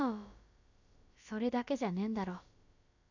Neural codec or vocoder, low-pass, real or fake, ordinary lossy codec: codec, 16 kHz, about 1 kbps, DyCAST, with the encoder's durations; 7.2 kHz; fake; none